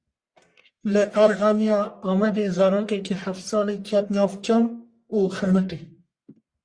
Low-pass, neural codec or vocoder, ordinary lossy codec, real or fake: 9.9 kHz; codec, 44.1 kHz, 1.7 kbps, Pupu-Codec; Opus, 64 kbps; fake